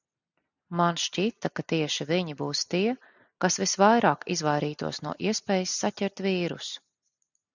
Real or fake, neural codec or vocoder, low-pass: real; none; 7.2 kHz